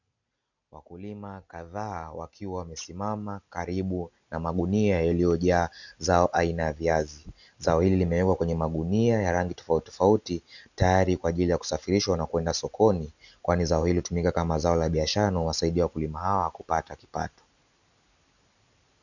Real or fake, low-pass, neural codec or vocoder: real; 7.2 kHz; none